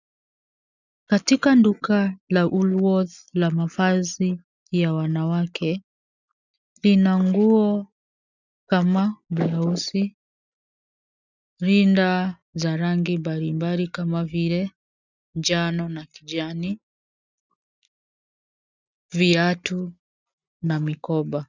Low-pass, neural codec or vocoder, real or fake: 7.2 kHz; none; real